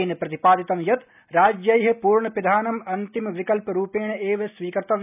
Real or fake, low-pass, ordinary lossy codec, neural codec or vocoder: real; 3.6 kHz; none; none